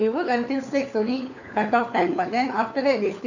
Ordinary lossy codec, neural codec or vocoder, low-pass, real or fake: none; codec, 16 kHz, 4 kbps, FunCodec, trained on LibriTTS, 50 frames a second; 7.2 kHz; fake